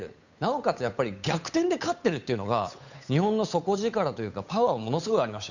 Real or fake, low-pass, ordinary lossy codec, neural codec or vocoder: fake; 7.2 kHz; none; codec, 16 kHz, 8 kbps, FunCodec, trained on Chinese and English, 25 frames a second